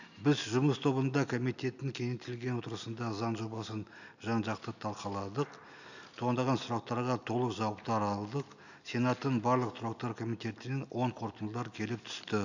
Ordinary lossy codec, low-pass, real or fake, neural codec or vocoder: none; 7.2 kHz; real; none